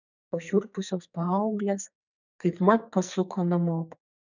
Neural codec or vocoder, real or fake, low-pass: codec, 44.1 kHz, 2.6 kbps, SNAC; fake; 7.2 kHz